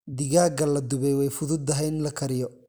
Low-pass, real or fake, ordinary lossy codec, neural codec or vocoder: none; real; none; none